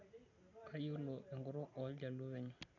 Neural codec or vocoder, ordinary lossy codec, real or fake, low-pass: none; none; real; 7.2 kHz